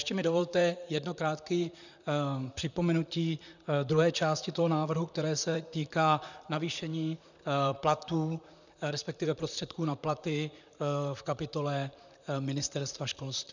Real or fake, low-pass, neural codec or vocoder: fake; 7.2 kHz; vocoder, 44.1 kHz, 128 mel bands, Pupu-Vocoder